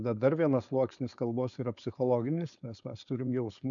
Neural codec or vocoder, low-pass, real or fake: codec, 16 kHz, 4.8 kbps, FACodec; 7.2 kHz; fake